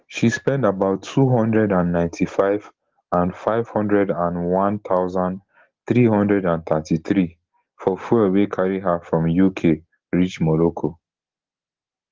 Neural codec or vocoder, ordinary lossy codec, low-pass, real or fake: none; Opus, 16 kbps; 7.2 kHz; real